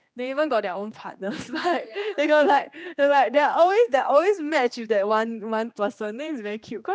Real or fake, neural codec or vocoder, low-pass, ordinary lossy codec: fake; codec, 16 kHz, 2 kbps, X-Codec, HuBERT features, trained on general audio; none; none